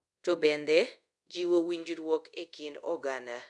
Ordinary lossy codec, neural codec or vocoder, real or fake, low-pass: MP3, 96 kbps; codec, 24 kHz, 0.5 kbps, DualCodec; fake; 10.8 kHz